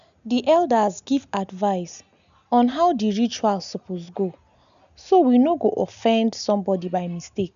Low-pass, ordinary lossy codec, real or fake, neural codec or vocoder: 7.2 kHz; none; real; none